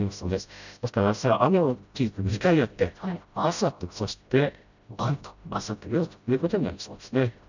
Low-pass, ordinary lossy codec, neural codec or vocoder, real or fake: 7.2 kHz; none; codec, 16 kHz, 0.5 kbps, FreqCodec, smaller model; fake